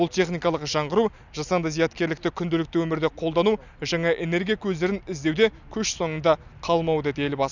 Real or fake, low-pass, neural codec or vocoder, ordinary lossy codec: real; 7.2 kHz; none; none